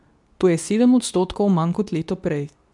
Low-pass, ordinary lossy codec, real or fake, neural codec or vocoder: 10.8 kHz; none; fake; codec, 24 kHz, 0.9 kbps, WavTokenizer, medium speech release version 2